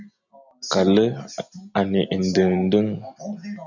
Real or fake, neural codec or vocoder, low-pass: real; none; 7.2 kHz